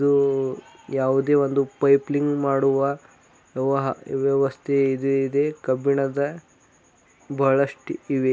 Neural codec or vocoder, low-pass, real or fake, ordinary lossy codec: none; none; real; none